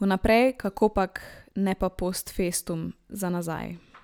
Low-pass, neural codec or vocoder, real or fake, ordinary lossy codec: none; none; real; none